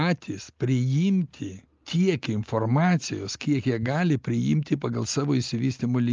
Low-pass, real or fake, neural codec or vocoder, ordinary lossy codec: 7.2 kHz; real; none; Opus, 24 kbps